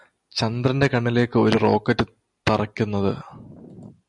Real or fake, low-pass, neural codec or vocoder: real; 10.8 kHz; none